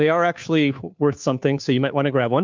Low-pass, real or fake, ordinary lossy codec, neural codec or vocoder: 7.2 kHz; fake; MP3, 64 kbps; codec, 16 kHz, 2 kbps, FunCodec, trained on Chinese and English, 25 frames a second